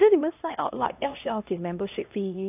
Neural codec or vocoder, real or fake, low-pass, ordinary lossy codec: codec, 16 kHz, 1 kbps, X-Codec, HuBERT features, trained on LibriSpeech; fake; 3.6 kHz; AAC, 32 kbps